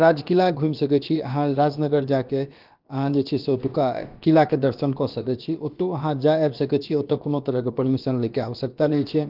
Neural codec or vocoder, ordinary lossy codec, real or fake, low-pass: codec, 16 kHz, about 1 kbps, DyCAST, with the encoder's durations; Opus, 32 kbps; fake; 5.4 kHz